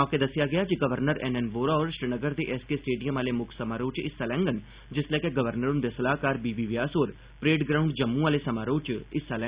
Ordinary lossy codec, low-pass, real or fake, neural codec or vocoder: Opus, 64 kbps; 3.6 kHz; real; none